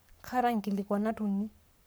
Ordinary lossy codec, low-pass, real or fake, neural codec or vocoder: none; none; fake; codec, 44.1 kHz, 7.8 kbps, Pupu-Codec